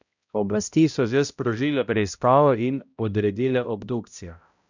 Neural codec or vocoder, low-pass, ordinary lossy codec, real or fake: codec, 16 kHz, 0.5 kbps, X-Codec, HuBERT features, trained on balanced general audio; 7.2 kHz; none; fake